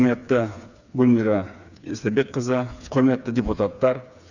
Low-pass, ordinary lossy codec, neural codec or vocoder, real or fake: 7.2 kHz; none; codec, 16 kHz, 4 kbps, FreqCodec, smaller model; fake